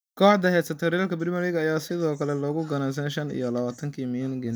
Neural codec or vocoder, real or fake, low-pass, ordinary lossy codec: none; real; none; none